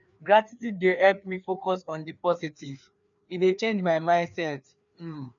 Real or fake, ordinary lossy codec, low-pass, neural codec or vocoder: fake; none; 7.2 kHz; codec, 16 kHz, 2 kbps, FreqCodec, larger model